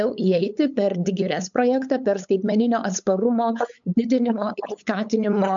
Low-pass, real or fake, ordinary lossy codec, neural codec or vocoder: 7.2 kHz; fake; MP3, 64 kbps; codec, 16 kHz, 8 kbps, FunCodec, trained on LibriTTS, 25 frames a second